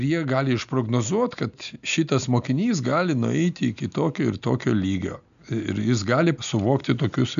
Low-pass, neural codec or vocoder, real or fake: 7.2 kHz; none; real